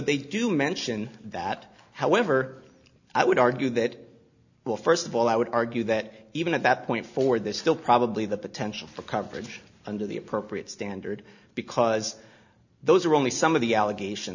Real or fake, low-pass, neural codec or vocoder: real; 7.2 kHz; none